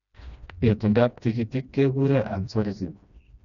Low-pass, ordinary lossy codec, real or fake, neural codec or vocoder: 7.2 kHz; none; fake; codec, 16 kHz, 1 kbps, FreqCodec, smaller model